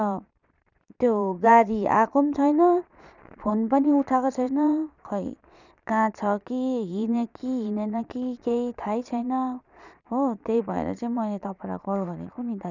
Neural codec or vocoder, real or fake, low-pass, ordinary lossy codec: vocoder, 22.05 kHz, 80 mel bands, Vocos; fake; 7.2 kHz; none